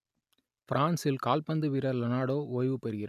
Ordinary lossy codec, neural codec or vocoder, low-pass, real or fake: none; none; 14.4 kHz; real